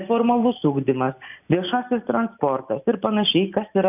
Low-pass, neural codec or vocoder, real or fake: 3.6 kHz; none; real